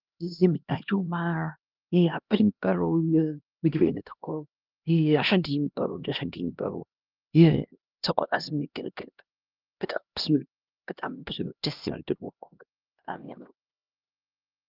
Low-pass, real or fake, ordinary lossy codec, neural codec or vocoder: 5.4 kHz; fake; Opus, 32 kbps; codec, 16 kHz, 1 kbps, X-Codec, HuBERT features, trained on LibriSpeech